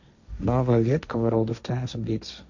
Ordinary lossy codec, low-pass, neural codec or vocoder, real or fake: none; 7.2 kHz; codec, 16 kHz, 1.1 kbps, Voila-Tokenizer; fake